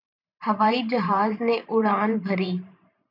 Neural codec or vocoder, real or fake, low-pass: vocoder, 44.1 kHz, 128 mel bands every 512 samples, BigVGAN v2; fake; 5.4 kHz